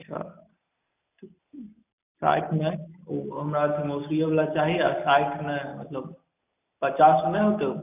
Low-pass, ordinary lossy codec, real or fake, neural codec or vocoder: 3.6 kHz; none; real; none